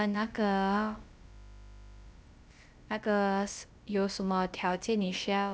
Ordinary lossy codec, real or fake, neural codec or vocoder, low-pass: none; fake; codec, 16 kHz, about 1 kbps, DyCAST, with the encoder's durations; none